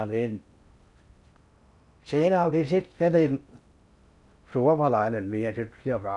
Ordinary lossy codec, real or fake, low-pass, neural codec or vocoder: none; fake; 10.8 kHz; codec, 16 kHz in and 24 kHz out, 0.6 kbps, FocalCodec, streaming, 4096 codes